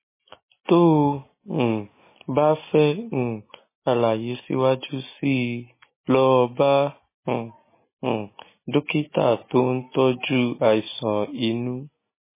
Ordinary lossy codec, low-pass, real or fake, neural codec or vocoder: MP3, 16 kbps; 3.6 kHz; real; none